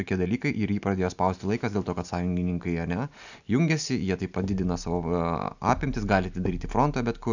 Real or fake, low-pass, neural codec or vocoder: real; 7.2 kHz; none